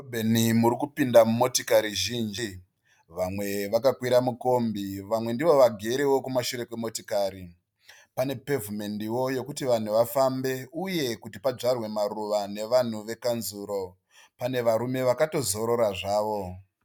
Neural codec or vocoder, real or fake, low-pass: none; real; 19.8 kHz